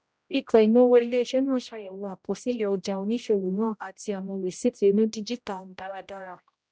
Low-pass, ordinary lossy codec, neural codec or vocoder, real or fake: none; none; codec, 16 kHz, 0.5 kbps, X-Codec, HuBERT features, trained on general audio; fake